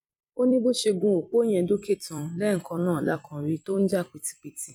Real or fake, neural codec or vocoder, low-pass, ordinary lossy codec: fake; vocoder, 44.1 kHz, 128 mel bands every 256 samples, BigVGAN v2; 19.8 kHz; none